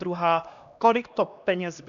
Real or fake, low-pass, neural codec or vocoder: fake; 7.2 kHz; codec, 16 kHz, 1 kbps, X-Codec, HuBERT features, trained on LibriSpeech